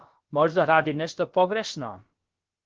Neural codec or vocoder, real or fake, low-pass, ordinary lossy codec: codec, 16 kHz, about 1 kbps, DyCAST, with the encoder's durations; fake; 7.2 kHz; Opus, 16 kbps